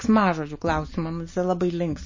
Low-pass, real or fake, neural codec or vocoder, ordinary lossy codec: 7.2 kHz; real; none; MP3, 32 kbps